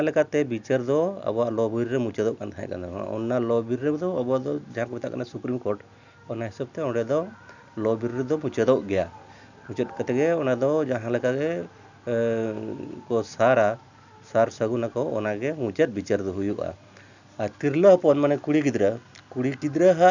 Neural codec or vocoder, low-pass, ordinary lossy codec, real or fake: none; 7.2 kHz; none; real